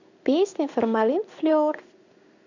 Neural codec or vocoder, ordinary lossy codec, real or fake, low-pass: codec, 16 kHz in and 24 kHz out, 1 kbps, XY-Tokenizer; none; fake; 7.2 kHz